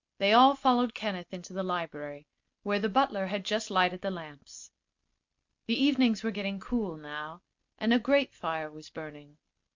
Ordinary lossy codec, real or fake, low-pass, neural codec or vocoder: MP3, 64 kbps; real; 7.2 kHz; none